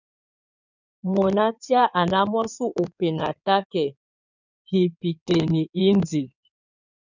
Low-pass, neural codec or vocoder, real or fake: 7.2 kHz; codec, 16 kHz in and 24 kHz out, 2.2 kbps, FireRedTTS-2 codec; fake